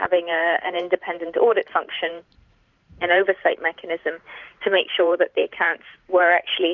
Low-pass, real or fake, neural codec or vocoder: 7.2 kHz; fake; vocoder, 44.1 kHz, 128 mel bands every 512 samples, BigVGAN v2